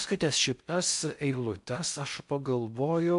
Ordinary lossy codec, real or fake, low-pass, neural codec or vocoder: MP3, 64 kbps; fake; 10.8 kHz; codec, 16 kHz in and 24 kHz out, 0.6 kbps, FocalCodec, streaming, 4096 codes